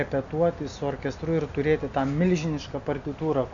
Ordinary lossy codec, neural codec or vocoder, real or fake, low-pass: AAC, 64 kbps; none; real; 7.2 kHz